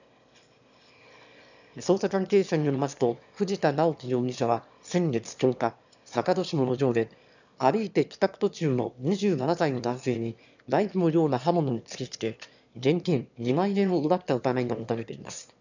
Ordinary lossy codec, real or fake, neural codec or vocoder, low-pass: none; fake; autoencoder, 22.05 kHz, a latent of 192 numbers a frame, VITS, trained on one speaker; 7.2 kHz